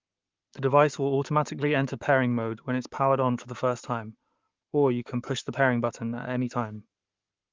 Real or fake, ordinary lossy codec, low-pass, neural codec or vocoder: fake; Opus, 24 kbps; 7.2 kHz; codec, 44.1 kHz, 7.8 kbps, Pupu-Codec